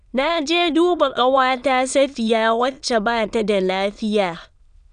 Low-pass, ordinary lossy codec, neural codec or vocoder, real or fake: 9.9 kHz; none; autoencoder, 22.05 kHz, a latent of 192 numbers a frame, VITS, trained on many speakers; fake